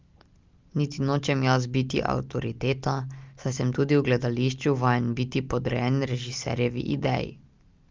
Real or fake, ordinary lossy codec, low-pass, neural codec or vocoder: real; Opus, 32 kbps; 7.2 kHz; none